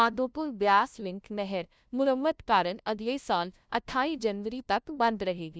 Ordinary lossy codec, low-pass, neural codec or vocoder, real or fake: none; none; codec, 16 kHz, 0.5 kbps, FunCodec, trained on LibriTTS, 25 frames a second; fake